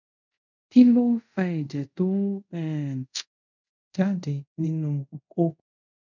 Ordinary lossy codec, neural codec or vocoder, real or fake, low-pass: none; codec, 24 kHz, 0.5 kbps, DualCodec; fake; 7.2 kHz